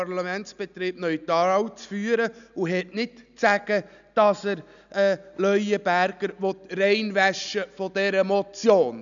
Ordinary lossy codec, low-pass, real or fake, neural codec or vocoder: none; 7.2 kHz; real; none